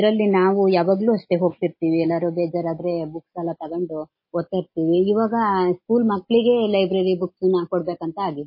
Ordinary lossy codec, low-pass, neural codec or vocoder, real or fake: MP3, 24 kbps; 5.4 kHz; none; real